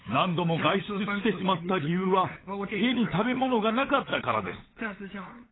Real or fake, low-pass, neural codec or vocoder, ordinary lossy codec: fake; 7.2 kHz; codec, 16 kHz, 8 kbps, FunCodec, trained on LibriTTS, 25 frames a second; AAC, 16 kbps